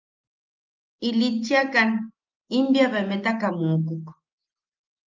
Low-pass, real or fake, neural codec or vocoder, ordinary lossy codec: 7.2 kHz; real; none; Opus, 24 kbps